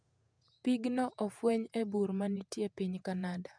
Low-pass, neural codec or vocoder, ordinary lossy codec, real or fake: 9.9 kHz; vocoder, 48 kHz, 128 mel bands, Vocos; none; fake